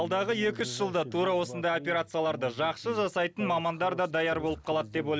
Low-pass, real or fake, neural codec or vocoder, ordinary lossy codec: none; real; none; none